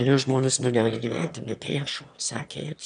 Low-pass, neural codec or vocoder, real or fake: 9.9 kHz; autoencoder, 22.05 kHz, a latent of 192 numbers a frame, VITS, trained on one speaker; fake